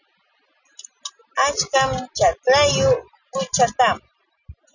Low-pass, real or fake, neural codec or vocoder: 7.2 kHz; real; none